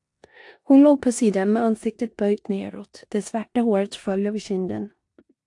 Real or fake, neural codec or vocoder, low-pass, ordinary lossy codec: fake; codec, 16 kHz in and 24 kHz out, 0.9 kbps, LongCat-Audio-Codec, four codebook decoder; 10.8 kHz; AAC, 64 kbps